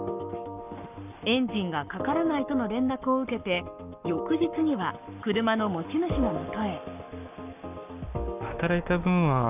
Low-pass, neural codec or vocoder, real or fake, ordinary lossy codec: 3.6 kHz; codec, 44.1 kHz, 7.8 kbps, Pupu-Codec; fake; none